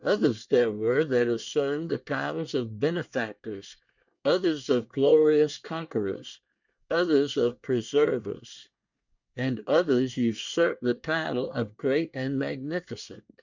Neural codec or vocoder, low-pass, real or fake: codec, 24 kHz, 1 kbps, SNAC; 7.2 kHz; fake